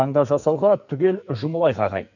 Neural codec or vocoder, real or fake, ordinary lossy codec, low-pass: codec, 44.1 kHz, 2.6 kbps, SNAC; fake; none; 7.2 kHz